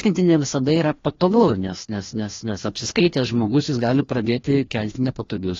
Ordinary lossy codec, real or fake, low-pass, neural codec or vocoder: AAC, 32 kbps; fake; 7.2 kHz; codec, 16 kHz, 1 kbps, FreqCodec, larger model